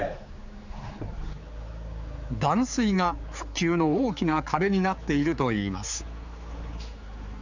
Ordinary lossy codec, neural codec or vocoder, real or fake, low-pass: none; codec, 16 kHz, 4 kbps, X-Codec, HuBERT features, trained on general audio; fake; 7.2 kHz